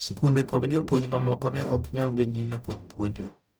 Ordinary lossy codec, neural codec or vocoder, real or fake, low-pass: none; codec, 44.1 kHz, 0.9 kbps, DAC; fake; none